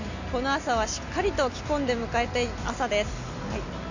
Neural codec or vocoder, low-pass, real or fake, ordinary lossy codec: none; 7.2 kHz; real; none